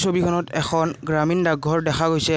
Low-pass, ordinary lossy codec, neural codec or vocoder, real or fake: none; none; none; real